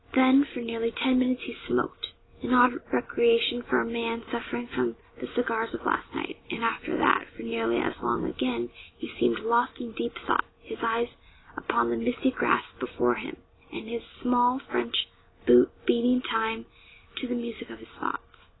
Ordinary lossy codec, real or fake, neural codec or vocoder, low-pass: AAC, 16 kbps; real; none; 7.2 kHz